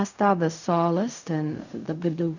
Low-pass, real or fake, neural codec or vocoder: 7.2 kHz; fake; codec, 16 kHz in and 24 kHz out, 0.4 kbps, LongCat-Audio-Codec, fine tuned four codebook decoder